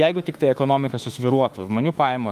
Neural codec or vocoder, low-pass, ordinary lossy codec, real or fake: autoencoder, 48 kHz, 32 numbers a frame, DAC-VAE, trained on Japanese speech; 14.4 kHz; Opus, 32 kbps; fake